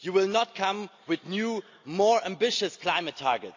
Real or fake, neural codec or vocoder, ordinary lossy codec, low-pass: real; none; AAC, 48 kbps; 7.2 kHz